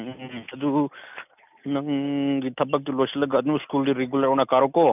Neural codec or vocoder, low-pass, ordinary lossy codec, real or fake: none; 3.6 kHz; none; real